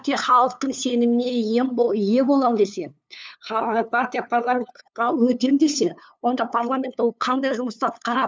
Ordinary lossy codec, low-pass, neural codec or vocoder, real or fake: none; none; codec, 16 kHz, 2 kbps, FunCodec, trained on LibriTTS, 25 frames a second; fake